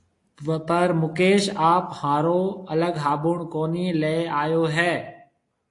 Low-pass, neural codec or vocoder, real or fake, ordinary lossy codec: 10.8 kHz; none; real; AAC, 48 kbps